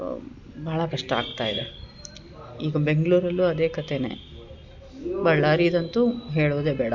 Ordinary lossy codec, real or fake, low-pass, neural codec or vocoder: MP3, 64 kbps; real; 7.2 kHz; none